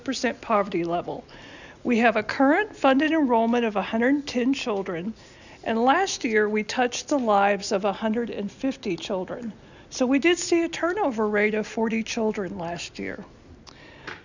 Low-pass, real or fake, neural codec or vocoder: 7.2 kHz; real; none